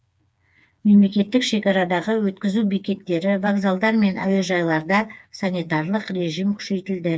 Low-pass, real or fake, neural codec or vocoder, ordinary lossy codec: none; fake; codec, 16 kHz, 4 kbps, FreqCodec, smaller model; none